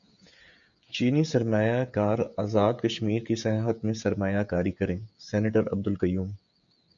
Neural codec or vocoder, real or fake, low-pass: codec, 16 kHz, 16 kbps, FreqCodec, smaller model; fake; 7.2 kHz